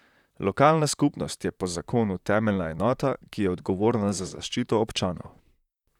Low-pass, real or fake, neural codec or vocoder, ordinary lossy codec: 19.8 kHz; fake; vocoder, 44.1 kHz, 128 mel bands, Pupu-Vocoder; none